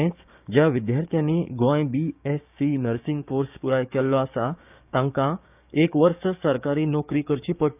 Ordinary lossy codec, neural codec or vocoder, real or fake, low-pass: none; codec, 16 kHz, 6 kbps, DAC; fake; 3.6 kHz